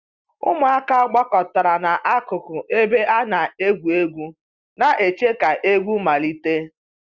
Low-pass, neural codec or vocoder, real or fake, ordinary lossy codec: 7.2 kHz; none; real; Opus, 64 kbps